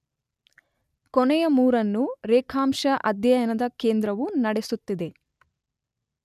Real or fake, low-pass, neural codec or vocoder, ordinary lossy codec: real; 14.4 kHz; none; none